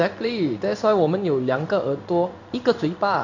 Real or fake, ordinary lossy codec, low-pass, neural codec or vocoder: fake; none; 7.2 kHz; codec, 16 kHz in and 24 kHz out, 1 kbps, XY-Tokenizer